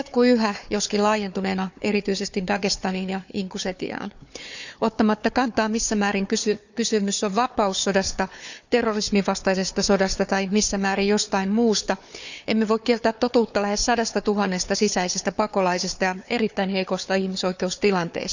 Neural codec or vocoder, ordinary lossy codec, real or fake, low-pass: codec, 16 kHz, 4 kbps, FunCodec, trained on Chinese and English, 50 frames a second; none; fake; 7.2 kHz